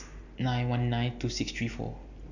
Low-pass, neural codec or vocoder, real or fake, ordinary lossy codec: 7.2 kHz; none; real; none